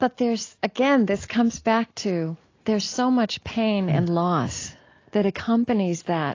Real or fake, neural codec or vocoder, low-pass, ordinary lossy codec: real; none; 7.2 kHz; AAC, 32 kbps